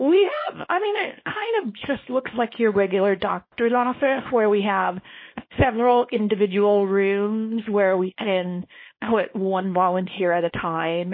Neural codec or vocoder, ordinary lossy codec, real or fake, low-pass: codec, 24 kHz, 0.9 kbps, WavTokenizer, small release; MP3, 24 kbps; fake; 5.4 kHz